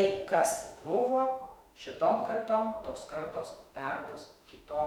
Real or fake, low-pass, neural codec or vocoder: fake; 19.8 kHz; autoencoder, 48 kHz, 32 numbers a frame, DAC-VAE, trained on Japanese speech